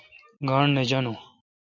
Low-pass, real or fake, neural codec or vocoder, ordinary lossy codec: 7.2 kHz; real; none; MP3, 64 kbps